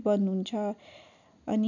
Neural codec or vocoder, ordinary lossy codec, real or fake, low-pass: none; none; real; 7.2 kHz